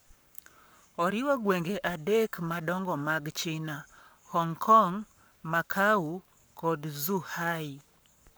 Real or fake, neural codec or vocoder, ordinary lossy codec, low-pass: fake; codec, 44.1 kHz, 7.8 kbps, Pupu-Codec; none; none